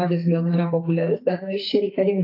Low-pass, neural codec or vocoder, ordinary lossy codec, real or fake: 5.4 kHz; codec, 44.1 kHz, 2.6 kbps, SNAC; AAC, 32 kbps; fake